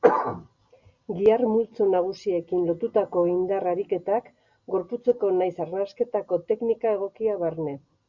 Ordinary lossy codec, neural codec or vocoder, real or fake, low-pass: Opus, 64 kbps; none; real; 7.2 kHz